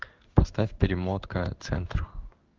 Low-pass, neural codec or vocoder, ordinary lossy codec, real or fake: 7.2 kHz; codec, 16 kHz in and 24 kHz out, 1 kbps, XY-Tokenizer; Opus, 32 kbps; fake